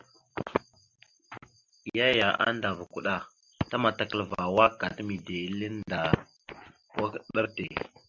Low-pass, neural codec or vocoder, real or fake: 7.2 kHz; none; real